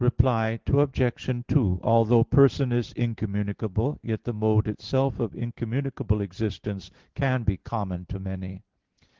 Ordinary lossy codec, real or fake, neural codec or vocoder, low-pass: Opus, 16 kbps; real; none; 7.2 kHz